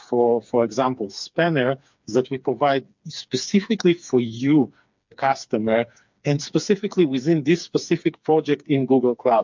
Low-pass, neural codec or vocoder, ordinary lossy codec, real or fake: 7.2 kHz; codec, 16 kHz, 4 kbps, FreqCodec, smaller model; MP3, 64 kbps; fake